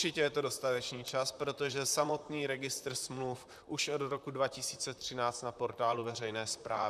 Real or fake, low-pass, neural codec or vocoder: fake; 14.4 kHz; vocoder, 44.1 kHz, 128 mel bands, Pupu-Vocoder